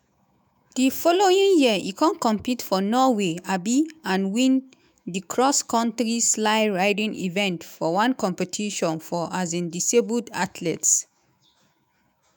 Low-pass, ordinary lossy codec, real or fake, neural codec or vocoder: none; none; fake; autoencoder, 48 kHz, 128 numbers a frame, DAC-VAE, trained on Japanese speech